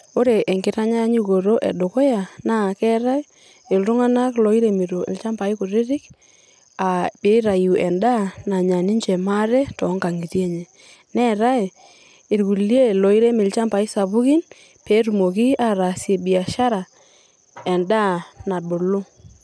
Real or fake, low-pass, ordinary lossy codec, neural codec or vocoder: real; none; none; none